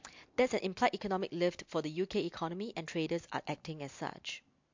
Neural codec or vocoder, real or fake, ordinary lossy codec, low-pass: none; real; MP3, 48 kbps; 7.2 kHz